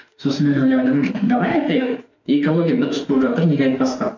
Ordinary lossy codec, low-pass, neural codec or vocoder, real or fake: none; 7.2 kHz; autoencoder, 48 kHz, 32 numbers a frame, DAC-VAE, trained on Japanese speech; fake